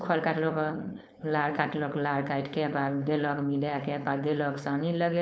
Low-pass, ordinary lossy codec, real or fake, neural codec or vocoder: none; none; fake; codec, 16 kHz, 4.8 kbps, FACodec